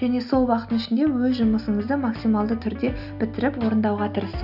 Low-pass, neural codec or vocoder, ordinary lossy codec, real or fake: 5.4 kHz; none; none; real